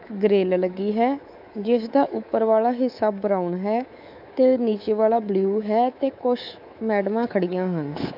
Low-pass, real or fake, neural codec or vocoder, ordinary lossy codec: 5.4 kHz; fake; codec, 24 kHz, 3.1 kbps, DualCodec; none